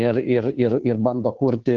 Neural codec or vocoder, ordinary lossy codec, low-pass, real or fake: codec, 16 kHz, 2 kbps, FunCodec, trained on Chinese and English, 25 frames a second; Opus, 16 kbps; 7.2 kHz; fake